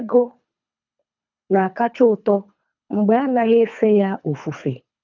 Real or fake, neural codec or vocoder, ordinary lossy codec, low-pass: fake; codec, 24 kHz, 3 kbps, HILCodec; none; 7.2 kHz